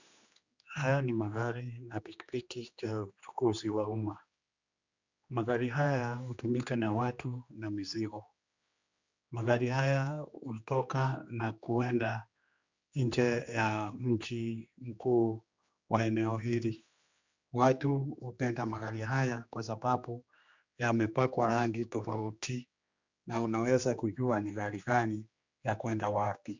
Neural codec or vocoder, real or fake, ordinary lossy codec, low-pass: codec, 16 kHz, 2 kbps, X-Codec, HuBERT features, trained on general audio; fake; Opus, 64 kbps; 7.2 kHz